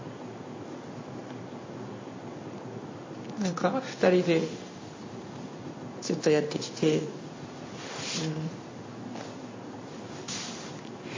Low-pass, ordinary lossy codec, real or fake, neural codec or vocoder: 7.2 kHz; MP3, 32 kbps; fake; codec, 16 kHz in and 24 kHz out, 1 kbps, XY-Tokenizer